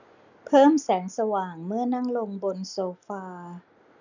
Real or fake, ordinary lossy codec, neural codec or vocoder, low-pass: real; none; none; 7.2 kHz